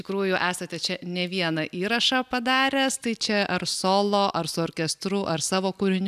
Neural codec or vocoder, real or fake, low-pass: none; real; 14.4 kHz